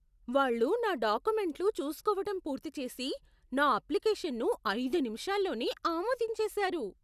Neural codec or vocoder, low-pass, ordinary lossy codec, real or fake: none; 14.4 kHz; none; real